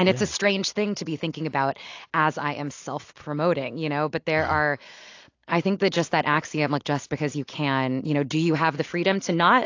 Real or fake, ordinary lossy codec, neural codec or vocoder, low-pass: real; AAC, 48 kbps; none; 7.2 kHz